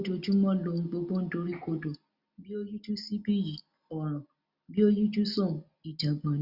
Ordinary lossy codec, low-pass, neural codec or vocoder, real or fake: Opus, 64 kbps; 5.4 kHz; none; real